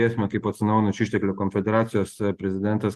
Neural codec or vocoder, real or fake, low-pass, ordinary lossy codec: none; real; 14.4 kHz; Opus, 24 kbps